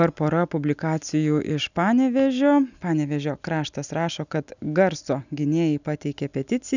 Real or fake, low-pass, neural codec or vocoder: real; 7.2 kHz; none